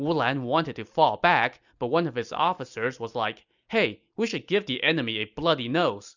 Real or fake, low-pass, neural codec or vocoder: real; 7.2 kHz; none